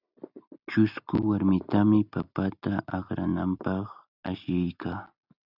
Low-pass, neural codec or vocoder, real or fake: 5.4 kHz; none; real